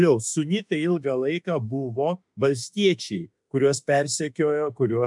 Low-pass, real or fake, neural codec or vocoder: 10.8 kHz; fake; autoencoder, 48 kHz, 32 numbers a frame, DAC-VAE, trained on Japanese speech